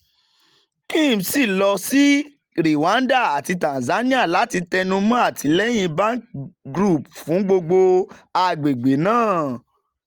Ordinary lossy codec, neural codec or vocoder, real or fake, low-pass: Opus, 32 kbps; none; real; 19.8 kHz